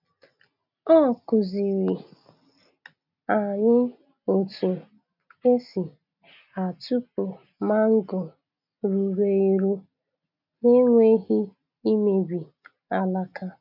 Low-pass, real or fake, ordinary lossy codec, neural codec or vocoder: 5.4 kHz; real; none; none